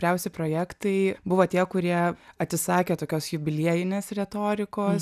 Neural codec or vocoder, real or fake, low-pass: none; real; 14.4 kHz